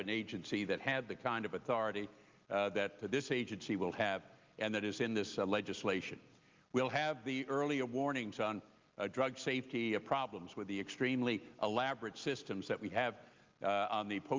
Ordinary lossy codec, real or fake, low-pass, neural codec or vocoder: Opus, 32 kbps; real; 7.2 kHz; none